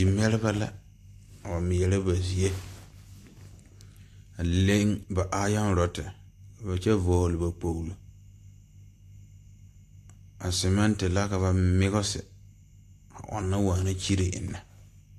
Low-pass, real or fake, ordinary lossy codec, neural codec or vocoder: 14.4 kHz; real; AAC, 64 kbps; none